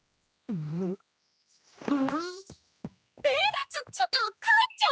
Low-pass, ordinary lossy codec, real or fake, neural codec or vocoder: none; none; fake; codec, 16 kHz, 1 kbps, X-Codec, HuBERT features, trained on general audio